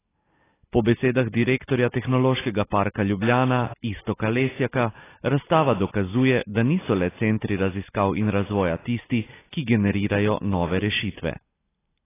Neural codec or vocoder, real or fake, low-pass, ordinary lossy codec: none; real; 3.6 kHz; AAC, 16 kbps